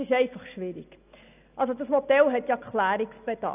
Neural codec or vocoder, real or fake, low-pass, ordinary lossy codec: none; real; 3.6 kHz; none